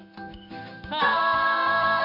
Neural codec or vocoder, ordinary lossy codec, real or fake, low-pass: codec, 16 kHz in and 24 kHz out, 1 kbps, XY-Tokenizer; none; fake; 5.4 kHz